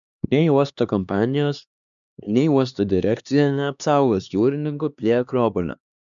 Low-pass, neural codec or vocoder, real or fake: 7.2 kHz; codec, 16 kHz, 2 kbps, X-Codec, HuBERT features, trained on LibriSpeech; fake